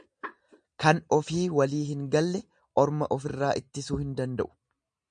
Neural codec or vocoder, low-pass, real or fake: none; 9.9 kHz; real